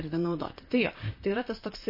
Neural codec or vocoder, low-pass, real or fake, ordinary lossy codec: vocoder, 44.1 kHz, 128 mel bands, Pupu-Vocoder; 5.4 kHz; fake; MP3, 24 kbps